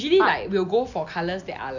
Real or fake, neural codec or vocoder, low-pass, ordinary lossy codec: real; none; 7.2 kHz; none